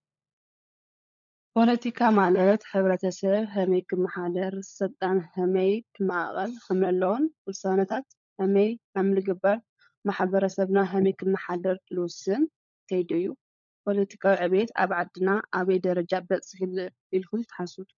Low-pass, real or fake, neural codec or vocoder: 7.2 kHz; fake; codec, 16 kHz, 16 kbps, FunCodec, trained on LibriTTS, 50 frames a second